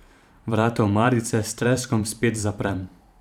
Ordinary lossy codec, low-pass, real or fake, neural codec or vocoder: none; 19.8 kHz; fake; vocoder, 44.1 kHz, 128 mel bands every 512 samples, BigVGAN v2